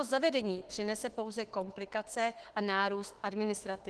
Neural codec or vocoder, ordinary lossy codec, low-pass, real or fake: autoencoder, 48 kHz, 32 numbers a frame, DAC-VAE, trained on Japanese speech; Opus, 16 kbps; 10.8 kHz; fake